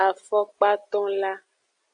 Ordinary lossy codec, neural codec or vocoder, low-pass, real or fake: AAC, 64 kbps; none; 9.9 kHz; real